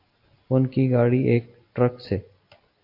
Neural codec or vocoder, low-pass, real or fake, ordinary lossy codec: none; 5.4 kHz; real; AAC, 32 kbps